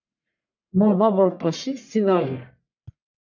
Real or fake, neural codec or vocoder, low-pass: fake; codec, 44.1 kHz, 1.7 kbps, Pupu-Codec; 7.2 kHz